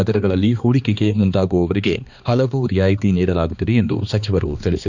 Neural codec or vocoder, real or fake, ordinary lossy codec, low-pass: codec, 16 kHz, 2 kbps, X-Codec, HuBERT features, trained on balanced general audio; fake; none; 7.2 kHz